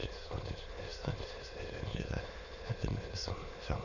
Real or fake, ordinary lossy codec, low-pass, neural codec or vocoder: fake; none; 7.2 kHz; autoencoder, 22.05 kHz, a latent of 192 numbers a frame, VITS, trained on many speakers